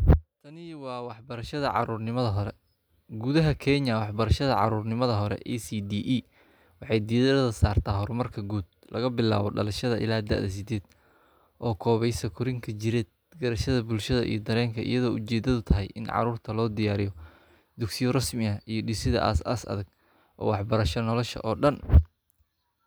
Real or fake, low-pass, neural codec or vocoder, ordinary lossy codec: real; none; none; none